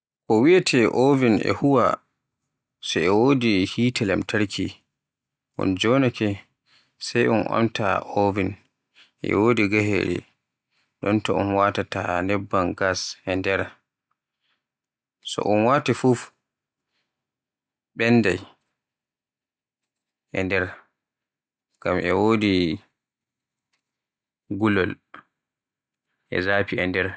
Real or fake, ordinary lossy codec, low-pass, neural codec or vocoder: real; none; none; none